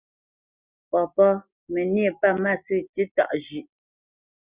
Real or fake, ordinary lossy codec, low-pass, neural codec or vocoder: real; Opus, 64 kbps; 3.6 kHz; none